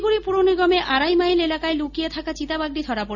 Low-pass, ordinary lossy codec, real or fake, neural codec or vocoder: 7.2 kHz; none; real; none